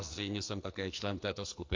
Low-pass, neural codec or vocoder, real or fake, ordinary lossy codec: 7.2 kHz; codec, 44.1 kHz, 2.6 kbps, SNAC; fake; MP3, 48 kbps